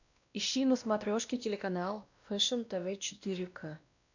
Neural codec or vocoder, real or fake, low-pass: codec, 16 kHz, 1 kbps, X-Codec, WavLM features, trained on Multilingual LibriSpeech; fake; 7.2 kHz